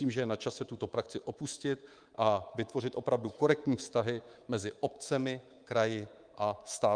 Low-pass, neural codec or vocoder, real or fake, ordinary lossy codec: 9.9 kHz; codec, 24 kHz, 3.1 kbps, DualCodec; fake; Opus, 32 kbps